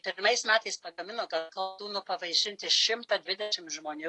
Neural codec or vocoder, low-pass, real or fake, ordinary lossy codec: none; 10.8 kHz; real; AAC, 48 kbps